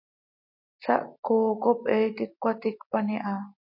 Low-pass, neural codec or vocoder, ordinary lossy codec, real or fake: 5.4 kHz; none; MP3, 32 kbps; real